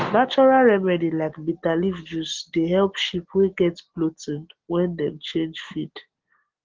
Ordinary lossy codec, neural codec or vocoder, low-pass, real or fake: Opus, 16 kbps; none; 7.2 kHz; real